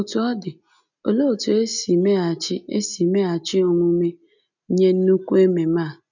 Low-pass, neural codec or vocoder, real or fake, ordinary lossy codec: 7.2 kHz; none; real; none